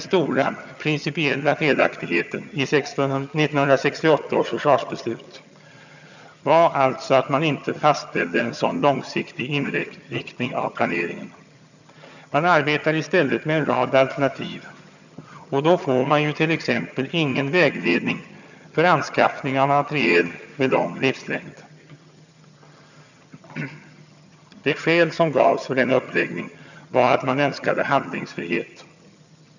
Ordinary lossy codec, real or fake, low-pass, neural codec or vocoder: none; fake; 7.2 kHz; vocoder, 22.05 kHz, 80 mel bands, HiFi-GAN